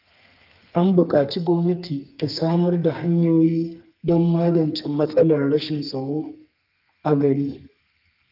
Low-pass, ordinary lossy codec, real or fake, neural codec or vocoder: 5.4 kHz; Opus, 32 kbps; fake; codec, 32 kHz, 1.9 kbps, SNAC